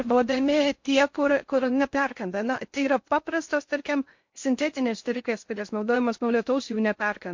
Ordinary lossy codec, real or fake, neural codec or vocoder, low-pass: MP3, 48 kbps; fake; codec, 16 kHz in and 24 kHz out, 0.6 kbps, FocalCodec, streaming, 4096 codes; 7.2 kHz